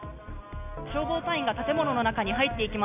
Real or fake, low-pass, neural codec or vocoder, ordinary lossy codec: real; 3.6 kHz; none; none